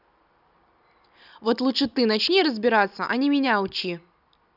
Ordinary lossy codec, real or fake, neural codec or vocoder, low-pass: none; real; none; 5.4 kHz